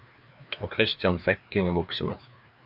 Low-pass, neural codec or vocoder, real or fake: 5.4 kHz; codec, 16 kHz, 2 kbps, FreqCodec, larger model; fake